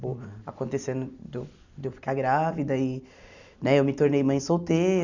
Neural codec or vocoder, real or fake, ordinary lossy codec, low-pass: none; real; none; 7.2 kHz